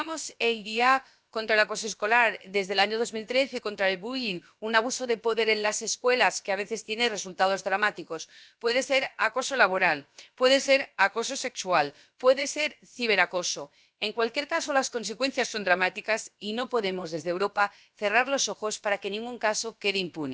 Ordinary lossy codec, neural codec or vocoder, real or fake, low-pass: none; codec, 16 kHz, about 1 kbps, DyCAST, with the encoder's durations; fake; none